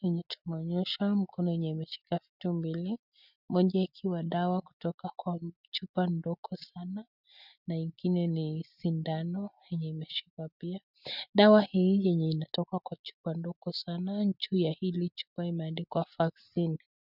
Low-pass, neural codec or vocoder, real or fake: 5.4 kHz; none; real